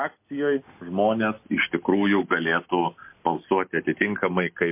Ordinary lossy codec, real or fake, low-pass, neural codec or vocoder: MP3, 24 kbps; real; 3.6 kHz; none